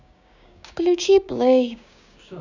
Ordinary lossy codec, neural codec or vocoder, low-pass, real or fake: none; codec, 16 kHz, 6 kbps, DAC; 7.2 kHz; fake